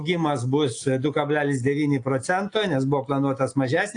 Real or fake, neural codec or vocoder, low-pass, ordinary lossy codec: real; none; 9.9 kHz; AAC, 48 kbps